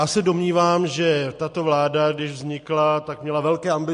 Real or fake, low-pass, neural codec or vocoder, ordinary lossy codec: real; 10.8 kHz; none; MP3, 48 kbps